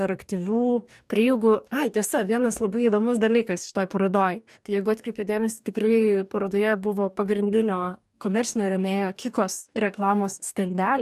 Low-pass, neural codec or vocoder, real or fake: 14.4 kHz; codec, 44.1 kHz, 2.6 kbps, DAC; fake